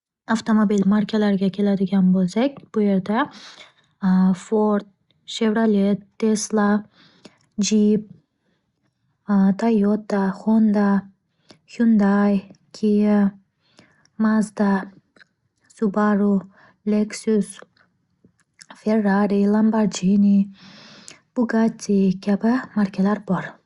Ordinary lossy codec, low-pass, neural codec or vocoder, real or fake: Opus, 64 kbps; 10.8 kHz; none; real